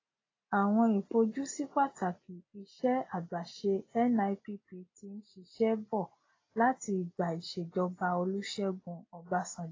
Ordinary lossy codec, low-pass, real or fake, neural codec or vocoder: AAC, 32 kbps; 7.2 kHz; real; none